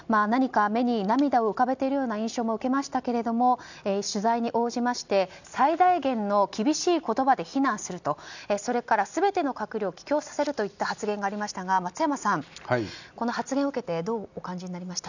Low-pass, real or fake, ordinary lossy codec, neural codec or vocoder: 7.2 kHz; real; none; none